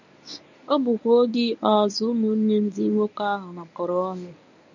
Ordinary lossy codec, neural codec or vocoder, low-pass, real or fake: none; codec, 24 kHz, 0.9 kbps, WavTokenizer, medium speech release version 1; 7.2 kHz; fake